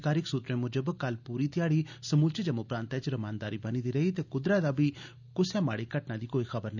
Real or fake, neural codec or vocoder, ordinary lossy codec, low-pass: real; none; none; 7.2 kHz